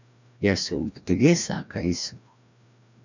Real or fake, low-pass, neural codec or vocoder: fake; 7.2 kHz; codec, 16 kHz, 1 kbps, FreqCodec, larger model